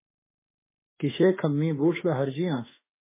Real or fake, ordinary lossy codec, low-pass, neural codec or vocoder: fake; MP3, 16 kbps; 3.6 kHz; autoencoder, 48 kHz, 32 numbers a frame, DAC-VAE, trained on Japanese speech